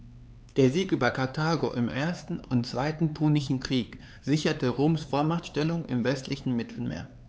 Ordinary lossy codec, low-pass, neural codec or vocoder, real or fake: none; none; codec, 16 kHz, 4 kbps, X-Codec, HuBERT features, trained on LibriSpeech; fake